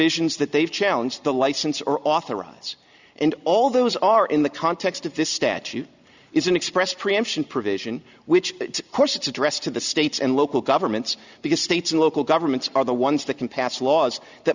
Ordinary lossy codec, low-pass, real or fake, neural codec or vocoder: Opus, 64 kbps; 7.2 kHz; real; none